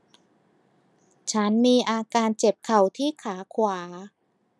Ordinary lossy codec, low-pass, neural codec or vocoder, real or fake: none; none; none; real